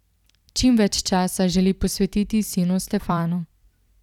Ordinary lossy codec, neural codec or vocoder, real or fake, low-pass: none; vocoder, 44.1 kHz, 128 mel bands every 256 samples, BigVGAN v2; fake; 19.8 kHz